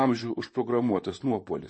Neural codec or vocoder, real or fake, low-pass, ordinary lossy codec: vocoder, 44.1 kHz, 128 mel bands, Pupu-Vocoder; fake; 9.9 kHz; MP3, 32 kbps